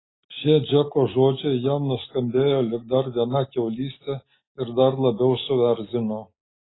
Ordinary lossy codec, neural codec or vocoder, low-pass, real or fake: AAC, 16 kbps; none; 7.2 kHz; real